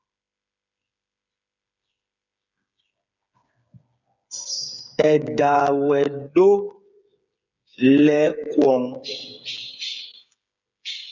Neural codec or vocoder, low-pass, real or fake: codec, 16 kHz, 8 kbps, FreqCodec, smaller model; 7.2 kHz; fake